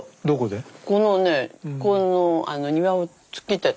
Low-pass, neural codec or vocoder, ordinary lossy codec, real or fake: none; none; none; real